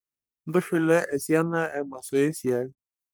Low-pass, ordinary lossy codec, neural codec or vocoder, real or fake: none; none; codec, 44.1 kHz, 3.4 kbps, Pupu-Codec; fake